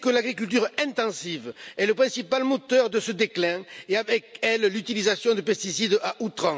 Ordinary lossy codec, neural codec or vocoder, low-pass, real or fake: none; none; none; real